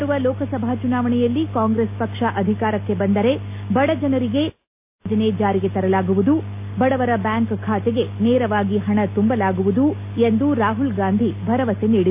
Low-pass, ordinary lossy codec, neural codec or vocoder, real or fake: 3.6 kHz; AAC, 32 kbps; none; real